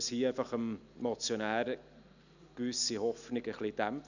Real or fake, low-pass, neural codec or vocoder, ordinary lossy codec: real; 7.2 kHz; none; none